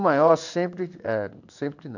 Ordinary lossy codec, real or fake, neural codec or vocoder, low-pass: none; fake; codec, 16 kHz in and 24 kHz out, 1 kbps, XY-Tokenizer; 7.2 kHz